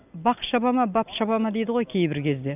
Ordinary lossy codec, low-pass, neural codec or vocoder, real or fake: none; 3.6 kHz; none; real